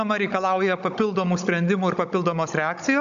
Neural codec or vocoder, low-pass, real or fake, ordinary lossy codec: codec, 16 kHz, 16 kbps, FunCodec, trained on Chinese and English, 50 frames a second; 7.2 kHz; fake; AAC, 96 kbps